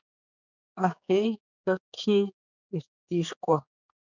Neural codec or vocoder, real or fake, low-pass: codec, 16 kHz, 4 kbps, X-Codec, HuBERT features, trained on general audio; fake; 7.2 kHz